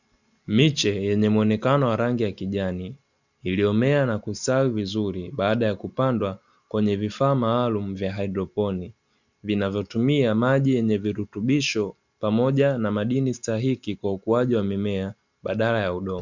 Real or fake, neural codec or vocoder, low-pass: real; none; 7.2 kHz